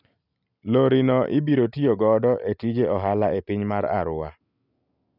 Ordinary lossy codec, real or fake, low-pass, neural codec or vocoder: none; real; 5.4 kHz; none